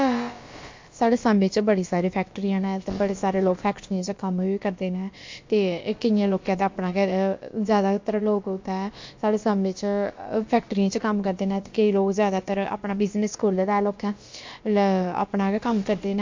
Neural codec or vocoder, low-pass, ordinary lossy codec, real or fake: codec, 16 kHz, about 1 kbps, DyCAST, with the encoder's durations; 7.2 kHz; MP3, 48 kbps; fake